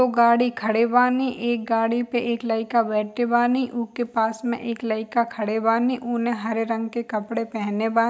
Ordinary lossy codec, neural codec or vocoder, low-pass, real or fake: none; none; none; real